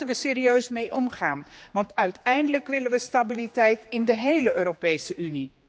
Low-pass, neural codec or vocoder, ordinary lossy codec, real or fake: none; codec, 16 kHz, 2 kbps, X-Codec, HuBERT features, trained on general audio; none; fake